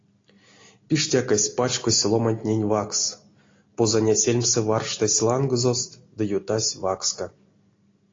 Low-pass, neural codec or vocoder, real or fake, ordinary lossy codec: 7.2 kHz; none; real; AAC, 32 kbps